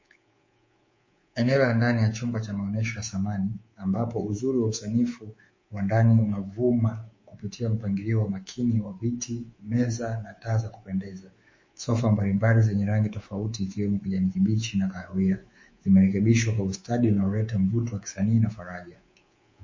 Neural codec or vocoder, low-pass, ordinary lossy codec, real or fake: codec, 24 kHz, 3.1 kbps, DualCodec; 7.2 kHz; MP3, 32 kbps; fake